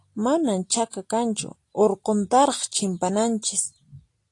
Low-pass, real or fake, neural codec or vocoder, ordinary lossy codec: 10.8 kHz; real; none; AAC, 48 kbps